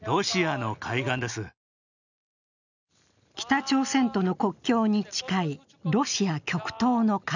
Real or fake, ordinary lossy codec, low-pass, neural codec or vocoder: real; none; 7.2 kHz; none